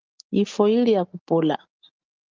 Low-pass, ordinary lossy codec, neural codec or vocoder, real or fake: 7.2 kHz; Opus, 24 kbps; none; real